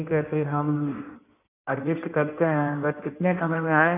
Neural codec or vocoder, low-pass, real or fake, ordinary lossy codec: codec, 16 kHz in and 24 kHz out, 1.1 kbps, FireRedTTS-2 codec; 3.6 kHz; fake; none